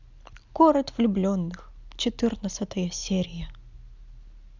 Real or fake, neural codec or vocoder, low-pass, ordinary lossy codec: real; none; 7.2 kHz; none